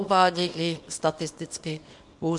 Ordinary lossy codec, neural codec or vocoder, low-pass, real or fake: MP3, 64 kbps; codec, 24 kHz, 0.9 kbps, WavTokenizer, small release; 10.8 kHz; fake